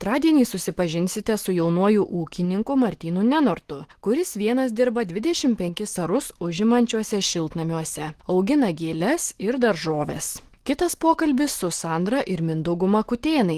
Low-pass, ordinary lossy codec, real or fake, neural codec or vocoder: 14.4 kHz; Opus, 16 kbps; fake; autoencoder, 48 kHz, 128 numbers a frame, DAC-VAE, trained on Japanese speech